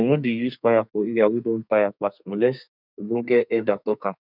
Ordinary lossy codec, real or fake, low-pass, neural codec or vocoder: none; fake; 5.4 kHz; codec, 32 kHz, 1.9 kbps, SNAC